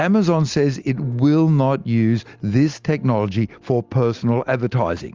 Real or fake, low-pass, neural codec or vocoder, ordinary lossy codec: real; 7.2 kHz; none; Opus, 32 kbps